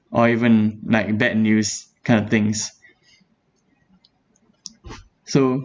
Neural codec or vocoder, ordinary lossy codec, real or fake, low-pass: none; none; real; none